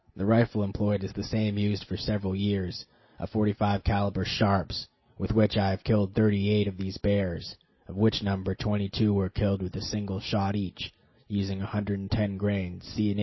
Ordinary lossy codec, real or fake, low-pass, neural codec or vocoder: MP3, 24 kbps; real; 7.2 kHz; none